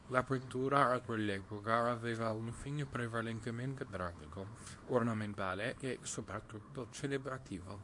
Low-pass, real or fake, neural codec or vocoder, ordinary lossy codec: 10.8 kHz; fake; codec, 24 kHz, 0.9 kbps, WavTokenizer, small release; MP3, 48 kbps